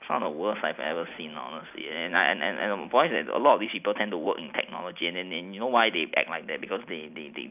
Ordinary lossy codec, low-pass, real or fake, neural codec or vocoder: none; 3.6 kHz; real; none